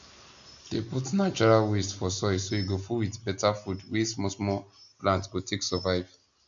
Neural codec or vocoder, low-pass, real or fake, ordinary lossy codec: none; 7.2 kHz; real; none